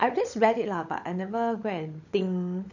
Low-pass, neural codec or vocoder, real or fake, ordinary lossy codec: 7.2 kHz; codec, 16 kHz, 16 kbps, FunCodec, trained on LibriTTS, 50 frames a second; fake; none